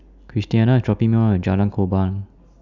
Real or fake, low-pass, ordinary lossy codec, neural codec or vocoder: real; 7.2 kHz; none; none